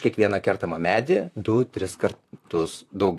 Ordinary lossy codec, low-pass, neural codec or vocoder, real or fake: AAC, 64 kbps; 14.4 kHz; vocoder, 44.1 kHz, 128 mel bands, Pupu-Vocoder; fake